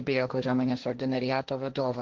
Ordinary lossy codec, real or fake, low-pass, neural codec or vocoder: Opus, 16 kbps; fake; 7.2 kHz; codec, 16 kHz, 1.1 kbps, Voila-Tokenizer